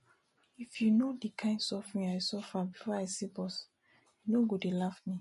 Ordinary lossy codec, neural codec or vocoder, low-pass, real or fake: MP3, 48 kbps; none; 14.4 kHz; real